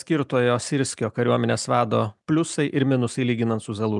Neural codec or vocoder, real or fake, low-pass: none; real; 10.8 kHz